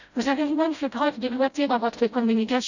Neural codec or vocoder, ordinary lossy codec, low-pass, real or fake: codec, 16 kHz, 0.5 kbps, FreqCodec, smaller model; none; 7.2 kHz; fake